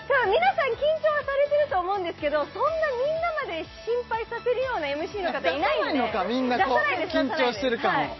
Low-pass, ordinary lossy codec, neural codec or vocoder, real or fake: 7.2 kHz; MP3, 24 kbps; none; real